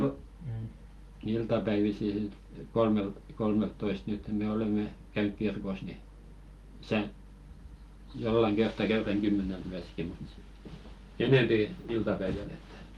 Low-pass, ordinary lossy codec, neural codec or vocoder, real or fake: 19.8 kHz; Opus, 24 kbps; none; real